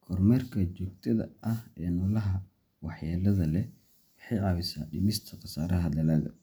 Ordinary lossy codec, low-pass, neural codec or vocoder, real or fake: none; none; none; real